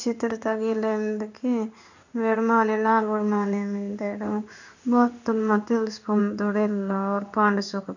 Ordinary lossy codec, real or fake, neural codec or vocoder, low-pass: none; fake; codec, 16 kHz in and 24 kHz out, 1 kbps, XY-Tokenizer; 7.2 kHz